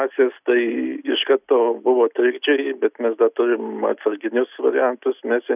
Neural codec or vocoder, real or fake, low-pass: none; real; 3.6 kHz